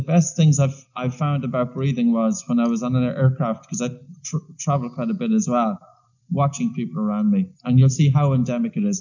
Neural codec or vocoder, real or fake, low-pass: none; real; 7.2 kHz